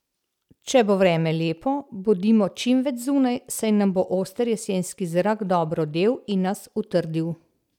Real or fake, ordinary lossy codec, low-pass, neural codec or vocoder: real; none; 19.8 kHz; none